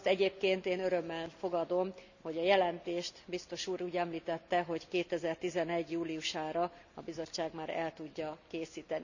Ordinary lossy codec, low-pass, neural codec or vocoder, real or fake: none; 7.2 kHz; none; real